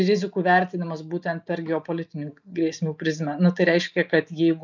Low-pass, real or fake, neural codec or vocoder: 7.2 kHz; real; none